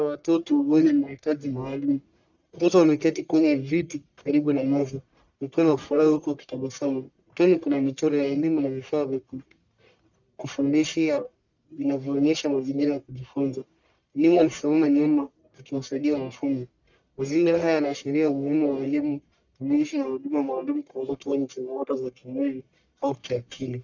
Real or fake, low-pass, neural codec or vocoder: fake; 7.2 kHz; codec, 44.1 kHz, 1.7 kbps, Pupu-Codec